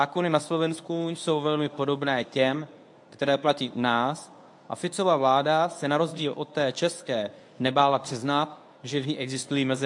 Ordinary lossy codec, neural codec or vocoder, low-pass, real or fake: AAC, 64 kbps; codec, 24 kHz, 0.9 kbps, WavTokenizer, medium speech release version 1; 10.8 kHz; fake